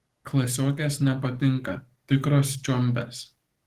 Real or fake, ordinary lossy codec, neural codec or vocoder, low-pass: fake; Opus, 16 kbps; codec, 44.1 kHz, 7.8 kbps, DAC; 14.4 kHz